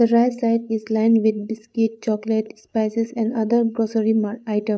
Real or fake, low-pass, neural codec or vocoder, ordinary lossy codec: fake; none; codec, 16 kHz, 8 kbps, FreqCodec, larger model; none